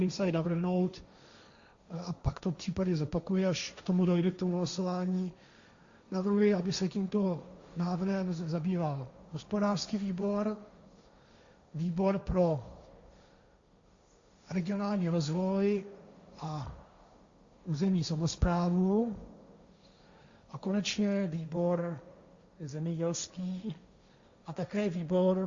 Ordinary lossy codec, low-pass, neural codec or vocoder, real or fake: Opus, 64 kbps; 7.2 kHz; codec, 16 kHz, 1.1 kbps, Voila-Tokenizer; fake